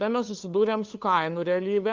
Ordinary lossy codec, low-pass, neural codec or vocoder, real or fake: Opus, 32 kbps; 7.2 kHz; codec, 16 kHz, 2 kbps, FunCodec, trained on LibriTTS, 25 frames a second; fake